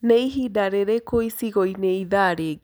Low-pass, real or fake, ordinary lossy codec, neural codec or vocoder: none; real; none; none